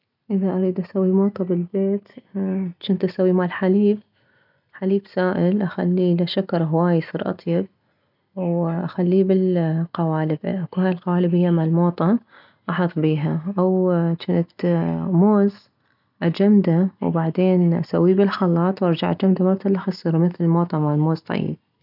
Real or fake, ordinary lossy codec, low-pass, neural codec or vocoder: real; none; 5.4 kHz; none